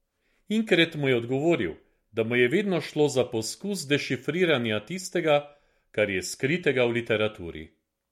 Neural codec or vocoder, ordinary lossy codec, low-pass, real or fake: none; MP3, 64 kbps; 19.8 kHz; real